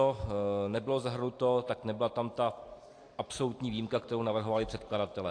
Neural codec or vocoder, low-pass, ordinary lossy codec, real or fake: none; 9.9 kHz; AAC, 48 kbps; real